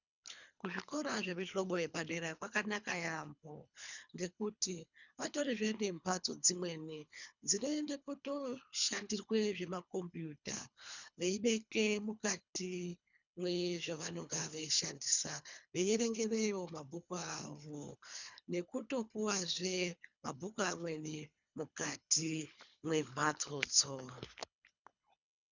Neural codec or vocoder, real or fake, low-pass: codec, 24 kHz, 3 kbps, HILCodec; fake; 7.2 kHz